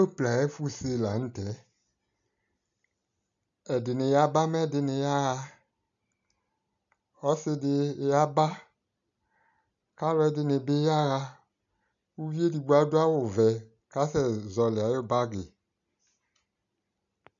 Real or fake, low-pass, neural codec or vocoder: real; 7.2 kHz; none